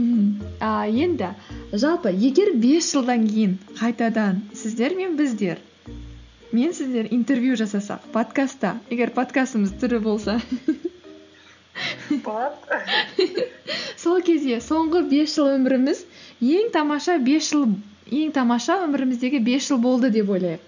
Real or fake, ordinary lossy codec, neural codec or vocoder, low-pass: real; none; none; 7.2 kHz